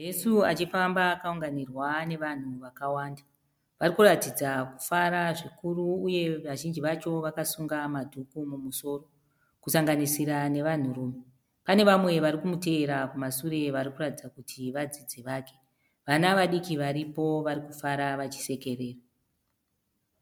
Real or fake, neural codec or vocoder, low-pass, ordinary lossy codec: real; none; 19.8 kHz; MP3, 96 kbps